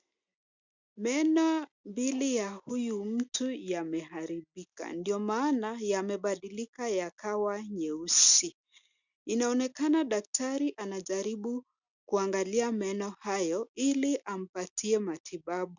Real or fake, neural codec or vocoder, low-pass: real; none; 7.2 kHz